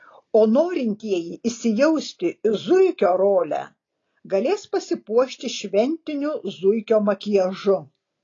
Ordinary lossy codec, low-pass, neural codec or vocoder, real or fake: AAC, 32 kbps; 7.2 kHz; none; real